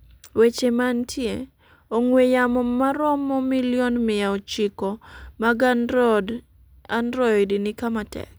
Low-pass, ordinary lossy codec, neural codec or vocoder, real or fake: none; none; none; real